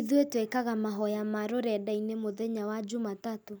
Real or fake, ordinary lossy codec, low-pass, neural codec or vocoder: real; none; none; none